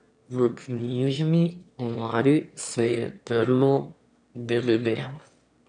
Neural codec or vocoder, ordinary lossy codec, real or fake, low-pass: autoencoder, 22.05 kHz, a latent of 192 numbers a frame, VITS, trained on one speaker; none; fake; 9.9 kHz